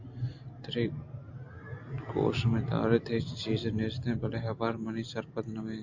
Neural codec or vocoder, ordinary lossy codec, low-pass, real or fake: none; AAC, 48 kbps; 7.2 kHz; real